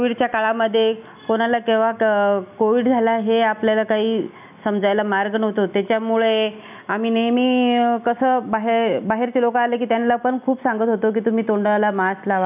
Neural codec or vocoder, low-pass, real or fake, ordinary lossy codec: none; 3.6 kHz; real; none